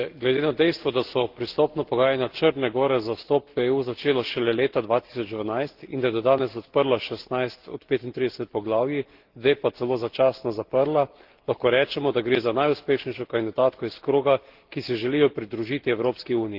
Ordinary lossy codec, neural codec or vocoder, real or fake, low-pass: Opus, 16 kbps; none; real; 5.4 kHz